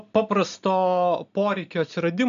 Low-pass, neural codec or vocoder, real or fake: 7.2 kHz; none; real